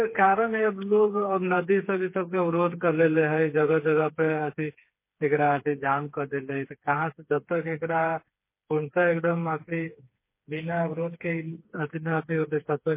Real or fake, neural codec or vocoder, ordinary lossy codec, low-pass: fake; codec, 16 kHz, 4 kbps, FreqCodec, smaller model; MP3, 24 kbps; 3.6 kHz